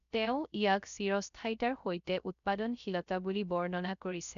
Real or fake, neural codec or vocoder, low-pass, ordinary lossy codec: fake; codec, 16 kHz, 0.3 kbps, FocalCodec; 7.2 kHz; none